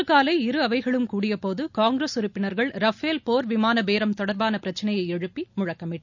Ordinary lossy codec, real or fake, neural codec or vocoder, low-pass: none; real; none; 7.2 kHz